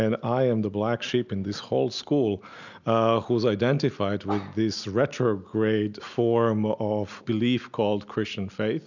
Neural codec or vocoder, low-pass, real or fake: none; 7.2 kHz; real